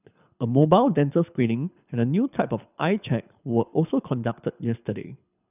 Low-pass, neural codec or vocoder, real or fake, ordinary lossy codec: 3.6 kHz; codec, 24 kHz, 6 kbps, HILCodec; fake; none